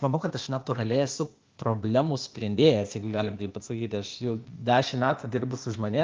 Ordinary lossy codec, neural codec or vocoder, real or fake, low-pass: Opus, 24 kbps; codec, 16 kHz, 0.8 kbps, ZipCodec; fake; 7.2 kHz